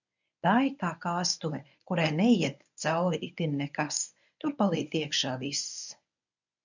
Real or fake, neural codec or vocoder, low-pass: fake; codec, 24 kHz, 0.9 kbps, WavTokenizer, medium speech release version 1; 7.2 kHz